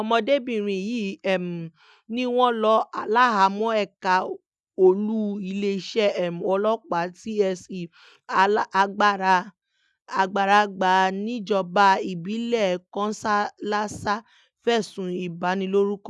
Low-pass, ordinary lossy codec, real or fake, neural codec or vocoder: none; none; real; none